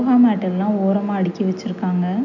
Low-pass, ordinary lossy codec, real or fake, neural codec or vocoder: 7.2 kHz; none; real; none